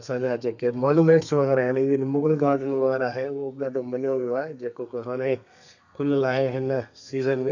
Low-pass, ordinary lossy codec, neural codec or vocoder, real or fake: 7.2 kHz; none; codec, 32 kHz, 1.9 kbps, SNAC; fake